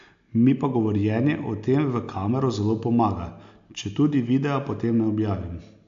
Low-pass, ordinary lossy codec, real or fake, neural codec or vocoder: 7.2 kHz; AAC, 64 kbps; real; none